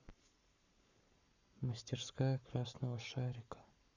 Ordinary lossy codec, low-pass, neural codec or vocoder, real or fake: none; 7.2 kHz; codec, 44.1 kHz, 7.8 kbps, Pupu-Codec; fake